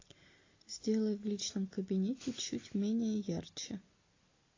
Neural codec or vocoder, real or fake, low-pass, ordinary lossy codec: none; real; 7.2 kHz; AAC, 32 kbps